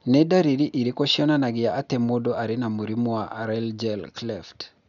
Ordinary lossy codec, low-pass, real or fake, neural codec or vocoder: none; 7.2 kHz; real; none